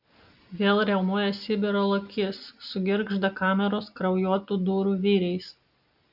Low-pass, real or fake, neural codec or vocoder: 5.4 kHz; real; none